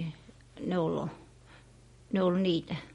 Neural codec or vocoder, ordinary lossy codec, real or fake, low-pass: none; MP3, 48 kbps; real; 19.8 kHz